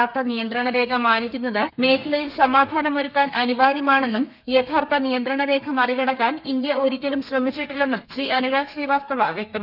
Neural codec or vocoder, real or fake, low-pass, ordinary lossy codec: codec, 32 kHz, 1.9 kbps, SNAC; fake; 5.4 kHz; none